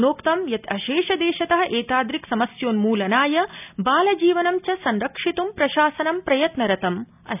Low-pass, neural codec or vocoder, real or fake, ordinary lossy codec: 3.6 kHz; none; real; none